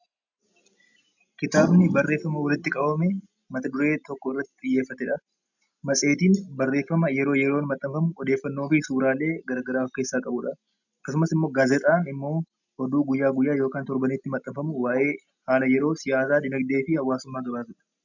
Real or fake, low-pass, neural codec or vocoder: real; 7.2 kHz; none